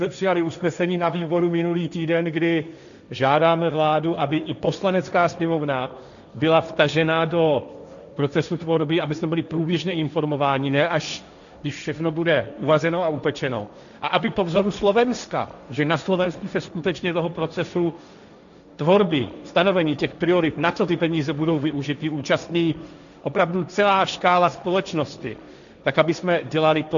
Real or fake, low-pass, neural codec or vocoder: fake; 7.2 kHz; codec, 16 kHz, 1.1 kbps, Voila-Tokenizer